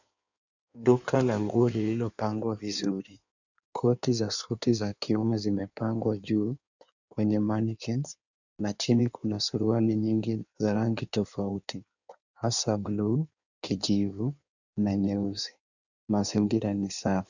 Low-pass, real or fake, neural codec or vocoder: 7.2 kHz; fake; codec, 16 kHz in and 24 kHz out, 1.1 kbps, FireRedTTS-2 codec